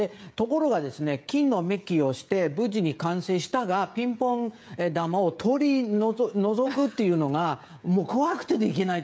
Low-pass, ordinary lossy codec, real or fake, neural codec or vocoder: none; none; fake; codec, 16 kHz, 16 kbps, FreqCodec, smaller model